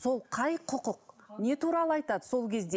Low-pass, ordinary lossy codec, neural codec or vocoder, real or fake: none; none; none; real